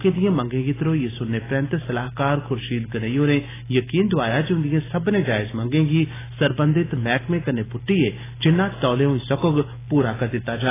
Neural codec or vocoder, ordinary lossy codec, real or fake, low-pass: none; AAC, 16 kbps; real; 3.6 kHz